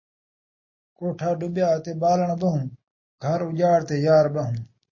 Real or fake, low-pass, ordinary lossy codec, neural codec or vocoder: real; 7.2 kHz; MP3, 32 kbps; none